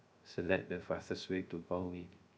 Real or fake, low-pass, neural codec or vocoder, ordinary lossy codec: fake; none; codec, 16 kHz, 0.3 kbps, FocalCodec; none